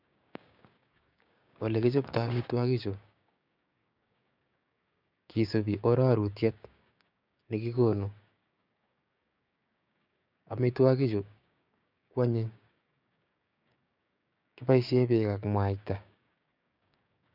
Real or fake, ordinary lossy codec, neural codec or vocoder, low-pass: fake; none; codec, 16 kHz, 6 kbps, DAC; 5.4 kHz